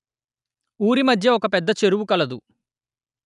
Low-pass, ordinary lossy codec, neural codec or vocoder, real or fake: 10.8 kHz; none; none; real